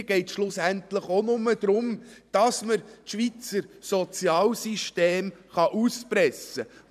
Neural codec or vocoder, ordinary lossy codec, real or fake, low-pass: vocoder, 44.1 kHz, 128 mel bands every 512 samples, BigVGAN v2; none; fake; 14.4 kHz